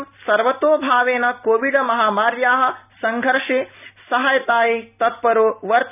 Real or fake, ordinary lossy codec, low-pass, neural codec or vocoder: real; none; 3.6 kHz; none